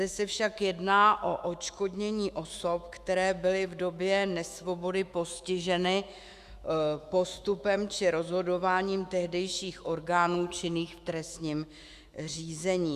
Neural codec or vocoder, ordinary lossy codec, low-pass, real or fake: autoencoder, 48 kHz, 128 numbers a frame, DAC-VAE, trained on Japanese speech; Opus, 64 kbps; 14.4 kHz; fake